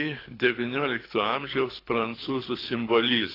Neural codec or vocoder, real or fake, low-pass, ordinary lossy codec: codec, 24 kHz, 6 kbps, HILCodec; fake; 5.4 kHz; AAC, 24 kbps